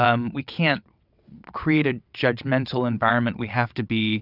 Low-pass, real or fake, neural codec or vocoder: 5.4 kHz; fake; vocoder, 22.05 kHz, 80 mel bands, WaveNeXt